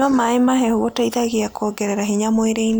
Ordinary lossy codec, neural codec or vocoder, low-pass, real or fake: none; none; none; real